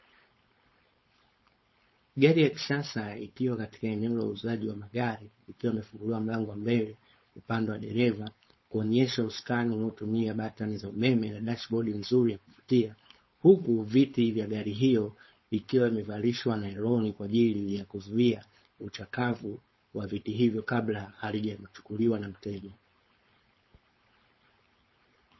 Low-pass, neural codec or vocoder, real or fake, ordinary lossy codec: 7.2 kHz; codec, 16 kHz, 4.8 kbps, FACodec; fake; MP3, 24 kbps